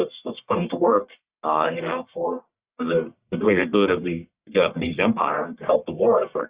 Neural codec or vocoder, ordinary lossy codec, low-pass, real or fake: codec, 44.1 kHz, 1.7 kbps, Pupu-Codec; Opus, 64 kbps; 3.6 kHz; fake